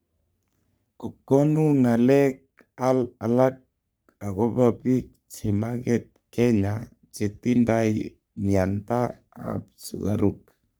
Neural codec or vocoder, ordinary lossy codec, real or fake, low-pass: codec, 44.1 kHz, 3.4 kbps, Pupu-Codec; none; fake; none